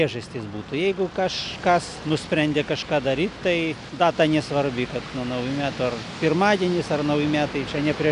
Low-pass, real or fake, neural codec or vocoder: 10.8 kHz; real; none